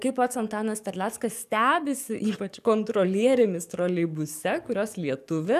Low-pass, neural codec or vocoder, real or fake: 14.4 kHz; codec, 44.1 kHz, 7.8 kbps, DAC; fake